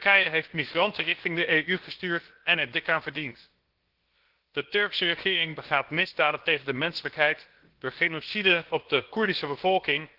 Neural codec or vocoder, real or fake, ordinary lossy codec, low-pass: codec, 16 kHz, about 1 kbps, DyCAST, with the encoder's durations; fake; Opus, 16 kbps; 5.4 kHz